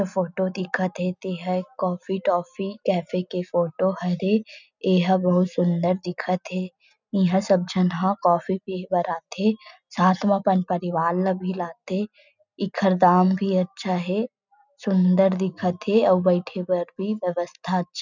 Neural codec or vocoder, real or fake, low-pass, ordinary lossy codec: none; real; 7.2 kHz; none